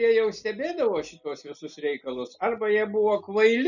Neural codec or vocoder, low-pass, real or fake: none; 7.2 kHz; real